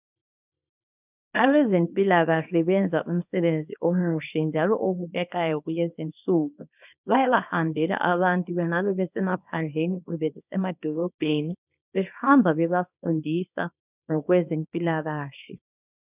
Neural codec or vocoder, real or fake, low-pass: codec, 24 kHz, 0.9 kbps, WavTokenizer, small release; fake; 3.6 kHz